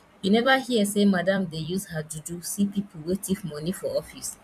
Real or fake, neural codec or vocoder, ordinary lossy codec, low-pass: fake; vocoder, 44.1 kHz, 128 mel bands every 256 samples, BigVGAN v2; none; 14.4 kHz